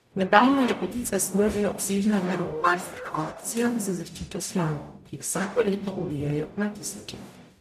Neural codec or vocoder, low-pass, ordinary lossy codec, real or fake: codec, 44.1 kHz, 0.9 kbps, DAC; 14.4 kHz; none; fake